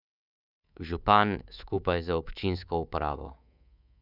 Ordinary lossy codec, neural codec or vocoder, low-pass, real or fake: none; codec, 24 kHz, 3.1 kbps, DualCodec; 5.4 kHz; fake